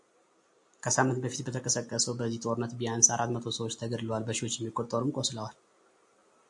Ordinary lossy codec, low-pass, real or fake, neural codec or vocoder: AAC, 64 kbps; 10.8 kHz; real; none